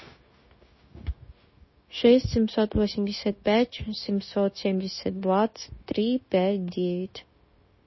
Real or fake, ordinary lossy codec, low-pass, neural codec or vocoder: fake; MP3, 24 kbps; 7.2 kHz; codec, 16 kHz, 0.9 kbps, LongCat-Audio-Codec